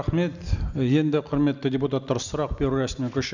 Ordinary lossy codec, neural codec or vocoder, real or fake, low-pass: none; none; real; 7.2 kHz